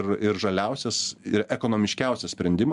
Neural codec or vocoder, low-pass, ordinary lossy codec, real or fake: none; 10.8 kHz; MP3, 96 kbps; real